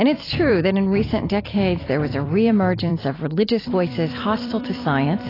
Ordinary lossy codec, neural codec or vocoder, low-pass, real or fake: AAC, 24 kbps; vocoder, 44.1 kHz, 128 mel bands every 256 samples, BigVGAN v2; 5.4 kHz; fake